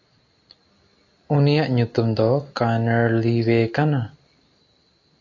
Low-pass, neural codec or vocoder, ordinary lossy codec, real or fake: 7.2 kHz; none; AAC, 48 kbps; real